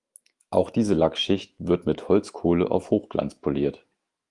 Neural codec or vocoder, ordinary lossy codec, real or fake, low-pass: autoencoder, 48 kHz, 128 numbers a frame, DAC-VAE, trained on Japanese speech; Opus, 32 kbps; fake; 10.8 kHz